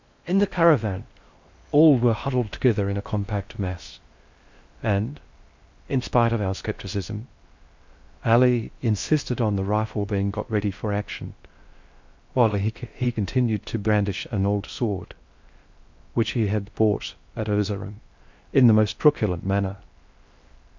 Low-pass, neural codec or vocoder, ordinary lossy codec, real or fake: 7.2 kHz; codec, 16 kHz in and 24 kHz out, 0.6 kbps, FocalCodec, streaming, 4096 codes; MP3, 64 kbps; fake